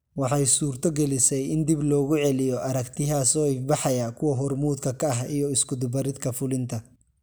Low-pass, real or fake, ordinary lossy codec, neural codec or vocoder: none; real; none; none